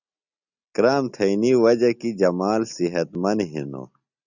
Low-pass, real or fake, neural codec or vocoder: 7.2 kHz; real; none